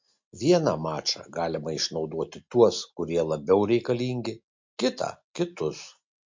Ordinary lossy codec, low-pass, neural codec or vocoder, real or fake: MP3, 48 kbps; 7.2 kHz; none; real